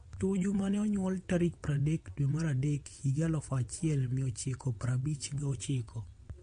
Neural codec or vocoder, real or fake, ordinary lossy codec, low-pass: vocoder, 22.05 kHz, 80 mel bands, WaveNeXt; fake; MP3, 48 kbps; 9.9 kHz